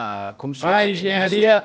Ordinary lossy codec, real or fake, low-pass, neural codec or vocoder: none; fake; none; codec, 16 kHz, 0.8 kbps, ZipCodec